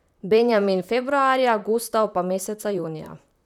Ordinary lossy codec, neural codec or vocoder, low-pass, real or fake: none; vocoder, 44.1 kHz, 128 mel bands, Pupu-Vocoder; 19.8 kHz; fake